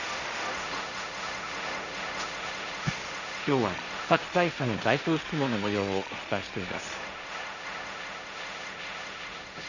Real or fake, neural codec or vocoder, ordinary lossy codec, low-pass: fake; codec, 16 kHz, 1.1 kbps, Voila-Tokenizer; none; 7.2 kHz